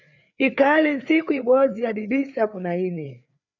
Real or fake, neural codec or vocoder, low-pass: fake; codec, 16 kHz, 4 kbps, FreqCodec, larger model; 7.2 kHz